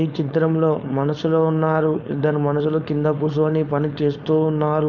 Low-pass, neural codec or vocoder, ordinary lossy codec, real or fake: 7.2 kHz; codec, 16 kHz, 4.8 kbps, FACodec; none; fake